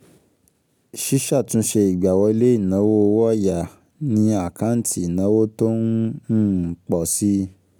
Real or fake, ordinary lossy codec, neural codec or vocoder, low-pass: real; none; none; none